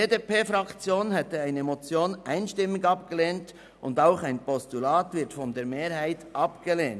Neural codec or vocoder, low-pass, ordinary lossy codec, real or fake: none; none; none; real